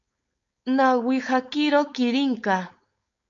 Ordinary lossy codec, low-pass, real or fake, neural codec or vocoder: MP3, 48 kbps; 7.2 kHz; fake; codec, 16 kHz, 4.8 kbps, FACodec